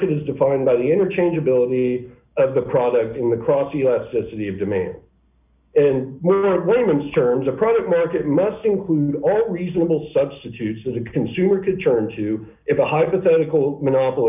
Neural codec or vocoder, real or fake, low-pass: none; real; 3.6 kHz